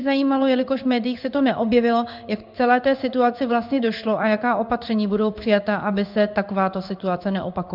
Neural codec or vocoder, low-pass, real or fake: codec, 16 kHz in and 24 kHz out, 1 kbps, XY-Tokenizer; 5.4 kHz; fake